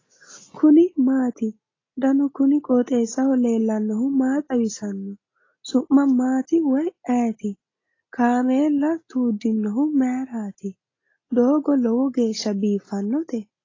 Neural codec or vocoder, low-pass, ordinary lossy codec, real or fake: none; 7.2 kHz; AAC, 32 kbps; real